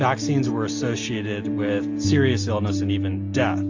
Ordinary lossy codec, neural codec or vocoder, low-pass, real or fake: AAC, 48 kbps; none; 7.2 kHz; real